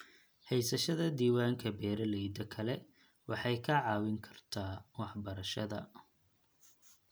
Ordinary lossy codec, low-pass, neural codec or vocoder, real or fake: none; none; none; real